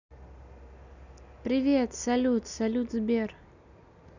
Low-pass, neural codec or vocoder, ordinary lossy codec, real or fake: 7.2 kHz; none; none; real